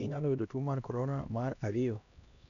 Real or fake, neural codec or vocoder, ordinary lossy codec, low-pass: fake; codec, 16 kHz, 1 kbps, X-Codec, HuBERT features, trained on LibriSpeech; none; 7.2 kHz